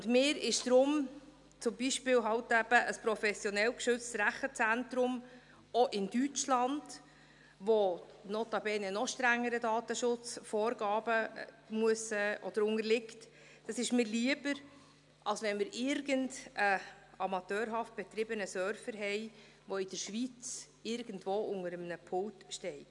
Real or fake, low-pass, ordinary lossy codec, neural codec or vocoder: real; 10.8 kHz; none; none